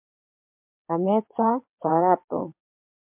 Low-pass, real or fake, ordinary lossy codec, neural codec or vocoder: 3.6 kHz; fake; MP3, 32 kbps; vocoder, 22.05 kHz, 80 mel bands, WaveNeXt